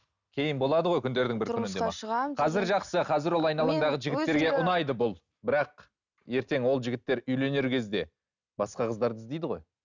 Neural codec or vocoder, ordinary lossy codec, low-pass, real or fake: none; none; 7.2 kHz; real